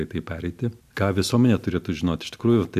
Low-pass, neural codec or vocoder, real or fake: 14.4 kHz; none; real